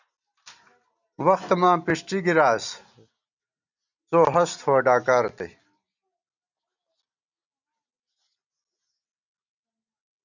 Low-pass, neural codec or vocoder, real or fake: 7.2 kHz; none; real